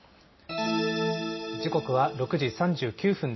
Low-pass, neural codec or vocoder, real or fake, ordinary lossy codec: 7.2 kHz; none; real; MP3, 24 kbps